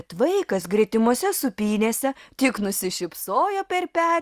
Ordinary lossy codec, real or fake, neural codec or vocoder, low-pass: Opus, 32 kbps; real; none; 14.4 kHz